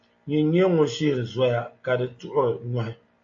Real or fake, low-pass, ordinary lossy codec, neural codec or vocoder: real; 7.2 kHz; AAC, 48 kbps; none